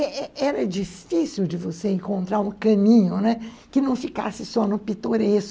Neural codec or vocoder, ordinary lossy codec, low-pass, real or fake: none; none; none; real